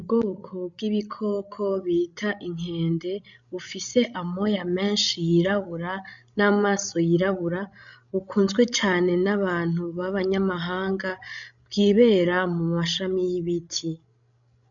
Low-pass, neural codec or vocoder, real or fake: 7.2 kHz; codec, 16 kHz, 16 kbps, FreqCodec, larger model; fake